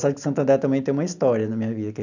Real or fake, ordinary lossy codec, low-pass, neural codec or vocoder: real; none; 7.2 kHz; none